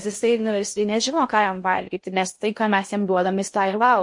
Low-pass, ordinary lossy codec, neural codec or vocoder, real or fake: 10.8 kHz; MP3, 48 kbps; codec, 16 kHz in and 24 kHz out, 0.6 kbps, FocalCodec, streaming, 2048 codes; fake